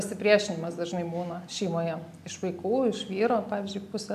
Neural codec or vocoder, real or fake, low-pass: none; real; 14.4 kHz